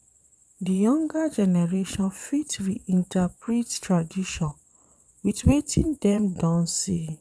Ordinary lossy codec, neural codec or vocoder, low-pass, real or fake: none; vocoder, 22.05 kHz, 80 mel bands, Vocos; none; fake